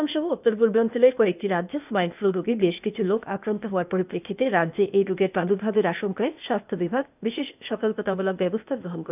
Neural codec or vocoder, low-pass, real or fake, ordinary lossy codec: codec, 16 kHz, 0.8 kbps, ZipCodec; 3.6 kHz; fake; none